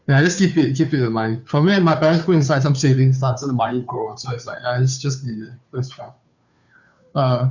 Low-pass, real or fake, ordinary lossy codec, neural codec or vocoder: 7.2 kHz; fake; none; codec, 16 kHz, 2 kbps, FunCodec, trained on Chinese and English, 25 frames a second